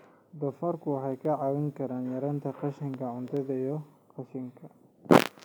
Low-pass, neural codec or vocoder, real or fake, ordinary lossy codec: none; none; real; none